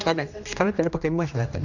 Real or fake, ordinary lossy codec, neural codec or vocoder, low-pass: fake; MP3, 64 kbps; codec, 16 kHz, 1 kbps, X-Codec, HuBERT features, trained on general audio; 7.2 kHz